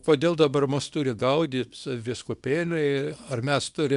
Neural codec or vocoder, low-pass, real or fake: codec, 24 kHz, 0.9 kbps, WavTokenizer, small release; 10.8 kHz; fake